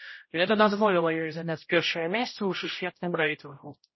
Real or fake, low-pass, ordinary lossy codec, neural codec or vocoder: fake; 7.2 kHz; MP3, 24 kbps; codec, 16 kHz, 0.5 kbps, X-Codec, HuBERT features, trained on general audio